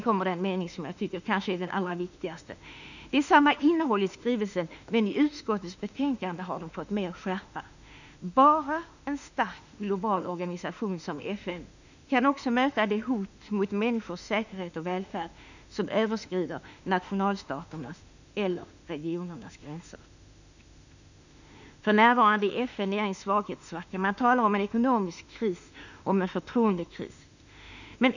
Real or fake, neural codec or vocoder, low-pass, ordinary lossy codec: fake; autoencoder, 48 kHz, 32 numbers a frame, DAC-VAE, trained on Japanese speech; 7.2 kHz; none